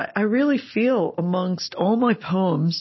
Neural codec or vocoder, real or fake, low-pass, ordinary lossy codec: none; real; 7.2 kHz; MP3, 24 kbps